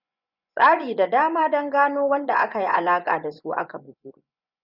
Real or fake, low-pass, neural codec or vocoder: fake; 5.4 kHz; vocoder, 24 kHz, 100 mel bands, Vocos